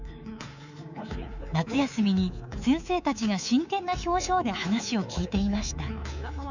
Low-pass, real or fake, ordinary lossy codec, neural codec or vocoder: 7.2 kHz; fake; none; codec, 24 kHz, 3.1 kbps, DualCodec